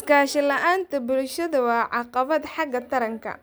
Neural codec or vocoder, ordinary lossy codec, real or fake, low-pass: none; none; real; none